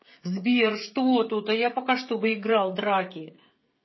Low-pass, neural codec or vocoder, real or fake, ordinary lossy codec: 7.2 kHz; codec, 16 kHz, 16 kbps, FreqCodec, smaller model; fake; MP3, 24 kbps